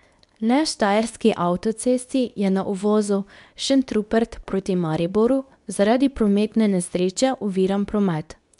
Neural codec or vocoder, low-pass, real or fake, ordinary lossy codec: codec, 24 kHz, 0.9 kbps, WavTokenizer, medium speech release version 2; 10.8 kHz; fake; none